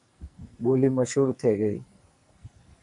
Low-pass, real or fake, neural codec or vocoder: 10.8 kHz; fake; codec, 44.1 kHz, 2.6 kbps, SNAC